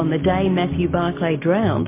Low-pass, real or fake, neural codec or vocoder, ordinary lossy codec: 3.6 kHz; real; none; MP3, 24 kbps